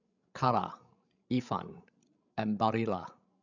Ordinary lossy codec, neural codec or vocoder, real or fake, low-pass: none; codec, 16 kHz, 16 kbps, FreqCodec, larger model; fake; 7.2 kHz